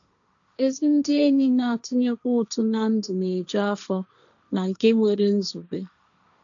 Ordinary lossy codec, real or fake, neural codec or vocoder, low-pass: none; fake; codec, 16 kHz, 1.1 kbps, Voila-Tokenizer; 7.2 kHz